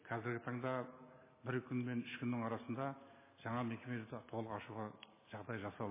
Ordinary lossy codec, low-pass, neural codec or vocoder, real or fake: MP3, 16 kbps; 3.6 kHz; none; real